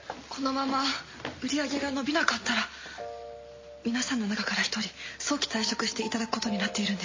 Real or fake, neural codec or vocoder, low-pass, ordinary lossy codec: real; none; 7.2 kHz; MP3, 32 kbps